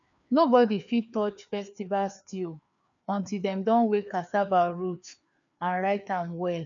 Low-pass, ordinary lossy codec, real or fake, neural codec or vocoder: 7.2 kHz; none; fake; codec, 16 kHz, 2 kbps, FreqCodec, larger model